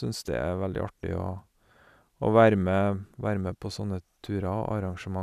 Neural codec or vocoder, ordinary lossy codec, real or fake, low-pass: none; none; real; 14.4 kHz